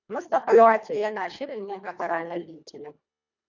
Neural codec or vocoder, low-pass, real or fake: codec, 24 kHz, 1.5 kbps, HILCodec; 7.2 kHz; fake